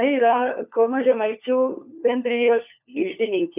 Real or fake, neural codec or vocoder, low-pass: fake; codec, 16 kHz, 4 kbps, FunCodec, trained on LibriTTS, 50 frames a second; 3.6 kHz